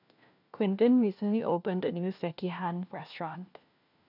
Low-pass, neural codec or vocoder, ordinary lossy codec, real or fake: 5.4 kHz; codec, 16 kHz, 1 kbps, FunCodec, trained on LibriTTS, 50 frames a second; none; fake